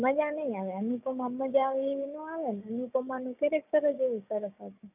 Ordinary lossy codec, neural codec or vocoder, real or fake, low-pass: none; codec, 16 kHz, 6 kbps, DAC; fake; 3.6 kHz